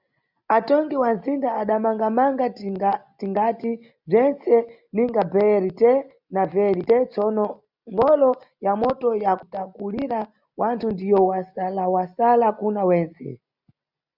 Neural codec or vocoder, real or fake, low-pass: none; real; 5.4 kHz